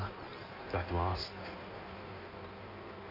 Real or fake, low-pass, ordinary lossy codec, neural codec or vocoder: fake; 5.4 kHz; none; codec, 16 kHz in and 24 kHz out, 1.1 kbps, FireRedTTS-2 codec